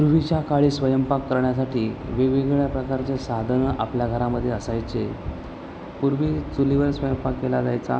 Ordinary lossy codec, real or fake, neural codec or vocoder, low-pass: none; real; none; none